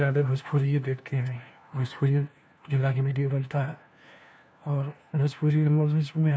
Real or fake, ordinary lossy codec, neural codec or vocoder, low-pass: fake; none; codec, 16 kHz, 1 kbps, FunCodec, trained on LibriTTS, 50 frames a second; none